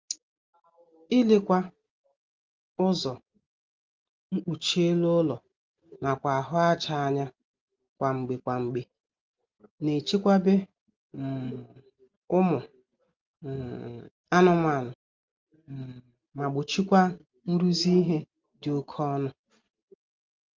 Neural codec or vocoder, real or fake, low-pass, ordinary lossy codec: none; real; 7.2 kHz; Opus, 32 kbps